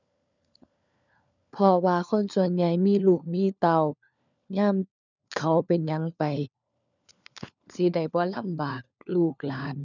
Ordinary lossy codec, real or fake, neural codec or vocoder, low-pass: none; fake; codec, 16 kHz, 4 kbps, FunCodec, trained on LibriTTS, 50 frames a second; 7.2 kHz